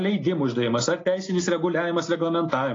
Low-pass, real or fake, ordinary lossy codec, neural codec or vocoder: 7.2 kHz; real; AAC, 32 kbps; none